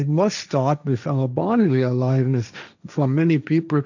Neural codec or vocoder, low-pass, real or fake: codec, 16 kHz, 1.1 kbps, Voila-Tokenizer; 7.2 kHz; fake